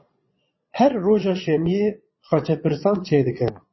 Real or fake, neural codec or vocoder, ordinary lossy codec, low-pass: fake; vocoder, 22.05 kHz, 80 mel bands, Vocos; MP3, 24 kbps; 7.2 kHz